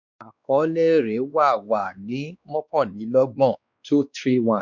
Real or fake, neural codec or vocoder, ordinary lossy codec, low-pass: fake; codec, 16 kHz, 2 kbps, X-Codec, WavLM features, trained on Multilingual LibriSpeech; none; 7.2 kHz